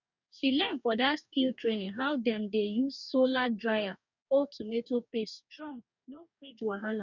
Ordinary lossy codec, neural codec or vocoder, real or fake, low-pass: none; codec, 44.1 kHz, 2.6 kbps, DAC; fake; 7.2 kHz